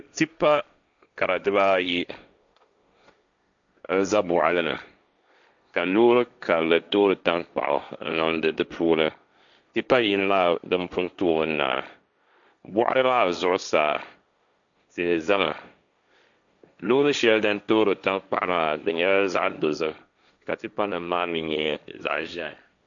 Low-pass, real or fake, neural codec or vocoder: 7.2 kHz; fake; codec, 16 kHz, 1.1 kbps, Voila-Tokenizer